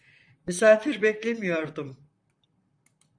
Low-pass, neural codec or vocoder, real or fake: 9.9 kHz; vocoder, 44.1 kHz, 128 mel bands, Pupu-Vocoder; fake